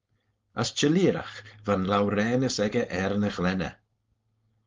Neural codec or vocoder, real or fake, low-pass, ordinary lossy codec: codec, 16 kHz, 4.8 kbps, FACodec; fake; 7.2 kHz; Opus, 32 kbps